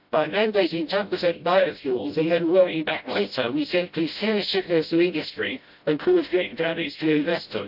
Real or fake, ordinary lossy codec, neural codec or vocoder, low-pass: fake; AAC, 48 kbps; codec, 16 kHz, 0.5 kbps, FreqCodec, smaller model; 5.4 kHz